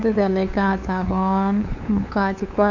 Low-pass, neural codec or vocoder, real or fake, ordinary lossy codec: 7.2 kHz; codec, 16 kHz, 2 kbps, FunCodec, trained on LibriTTS, 25 frames a second; fake; none